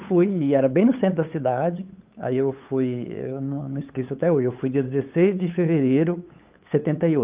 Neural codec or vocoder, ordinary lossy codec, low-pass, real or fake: codec, 16 kHz, 8 kbps, FunCodec, trained on LibriTTS, 25 frames a second; Opus, 24 kbps; 3.6 kHz; fake